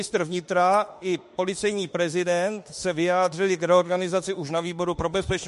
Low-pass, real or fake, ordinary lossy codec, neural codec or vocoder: 14.4 kHz; fake; MP3, 48 kbps; autoencoder, 48 kHz, 32 numbers a frame, DAC-VAE, trained on Japanese speech